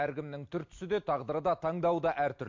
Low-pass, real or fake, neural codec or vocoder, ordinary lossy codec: 7.2 kHz; real; none; MP3, 48 kbps